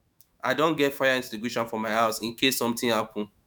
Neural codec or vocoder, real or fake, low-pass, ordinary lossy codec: autoencoder, 48 kHz, 128 numbers a frame, DAC-VAE, trained on Japanese speech; fake; none; none